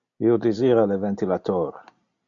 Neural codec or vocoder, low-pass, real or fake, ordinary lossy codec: none; 7.2 kHz; real; MP3, 64 kbps